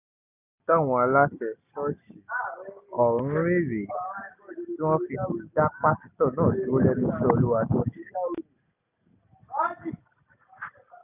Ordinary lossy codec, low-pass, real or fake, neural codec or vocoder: none; 3.6 kHz; real; none